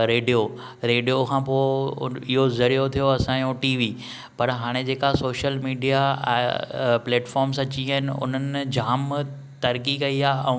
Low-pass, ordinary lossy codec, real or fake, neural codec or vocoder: none; none; real; none